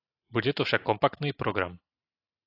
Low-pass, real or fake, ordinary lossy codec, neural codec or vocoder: 5.4 kHz; real; AAC, 24 kbps; none